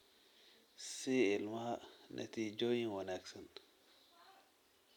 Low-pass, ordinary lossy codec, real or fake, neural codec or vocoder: 19.8 kHz; none; real; none